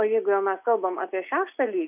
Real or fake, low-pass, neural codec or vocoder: real; 3.6 kHz; none